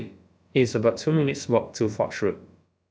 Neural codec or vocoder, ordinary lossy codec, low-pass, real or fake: codec, 16 kHz, about 1 kbps, DyCAST, with the encoder's durations; none; none; fake